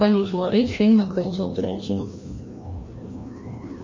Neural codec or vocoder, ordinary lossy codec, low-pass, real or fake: codec, 16 kHz, 1 kbps, FreqCodec, larger model; MP3, 32 kbps; 7.2 kHz; fake